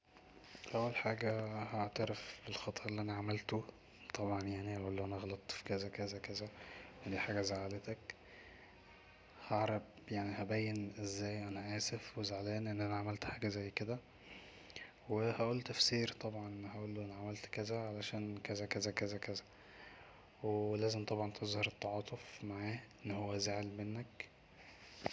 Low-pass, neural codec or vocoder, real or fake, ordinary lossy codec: none; none; real; none